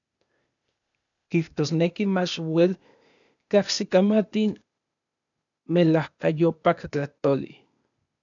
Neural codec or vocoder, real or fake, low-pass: codec, 16 kHz, 0.8 kbps, ZipCodec; fake; 7.2 kHz